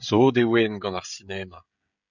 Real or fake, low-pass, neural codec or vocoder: fake; 7.2 kHz; codec, 16 kHz, 16 kbps, FreqCodec, smaller model